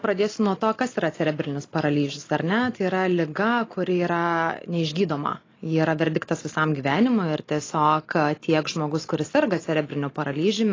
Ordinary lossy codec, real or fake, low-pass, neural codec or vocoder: AAC, 32 kbps; real; 7.2 kHz; none